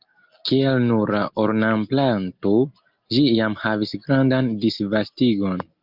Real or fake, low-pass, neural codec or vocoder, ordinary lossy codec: real; 5.4 kHz; none; Opus, 32 kbps